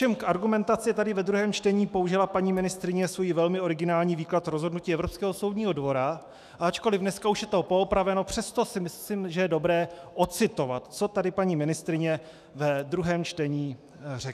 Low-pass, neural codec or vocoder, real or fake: 14.4 kHz; autoencoder, 48 kHz, 128 numbers a frame, DAC-VAE, trained on Japanese speech; fake